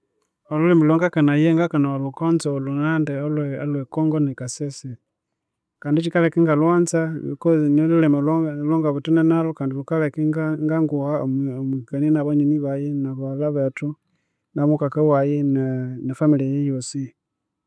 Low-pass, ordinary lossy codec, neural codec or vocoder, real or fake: none; none; none; real